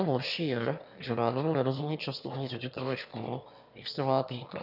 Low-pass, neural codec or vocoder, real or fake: 5.4 kHz; autoencoder, 22.05 kHz, a latent of 192 numbers a frame, VITS, trained on one speaker; fake